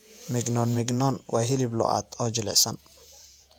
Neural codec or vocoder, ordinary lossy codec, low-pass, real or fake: vocoder, 48 kHz, 128 mel bands, Vocos; none; 19.8 kHz; fake